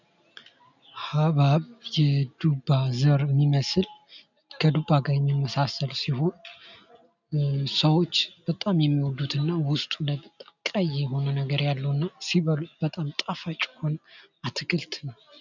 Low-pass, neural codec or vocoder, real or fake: 7.2 kHz; none; real